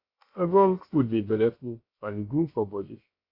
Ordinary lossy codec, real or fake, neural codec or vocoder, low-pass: AAC, 32 kbps; fake; codec, 16 kHz, about 1 kbps, DyCAST, with the encoder's durations; 5.4 kHz